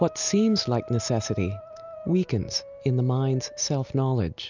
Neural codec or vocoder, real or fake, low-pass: none; real; 7.2 kHz